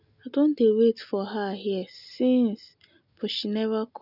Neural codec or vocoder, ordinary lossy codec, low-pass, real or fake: none; none; 5.4 kHz; real